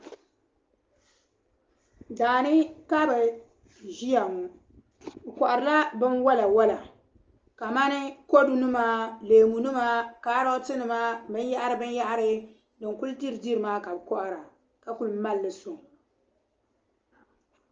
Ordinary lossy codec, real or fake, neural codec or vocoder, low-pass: Opus, 24 kbps; real; none; 7.2 kHz